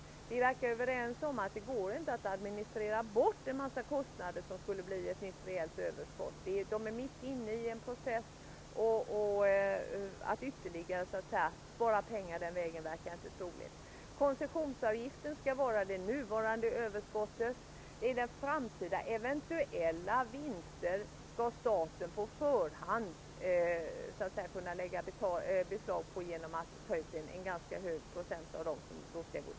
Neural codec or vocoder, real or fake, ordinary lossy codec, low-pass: none; real; none; none